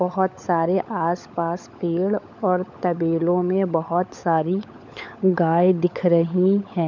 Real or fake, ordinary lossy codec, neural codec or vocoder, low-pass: fake; none; codec, 16 kHz, 16 kbps, FunCodec, trained on LibriTTS, 50 frames a second; 7.2 kHz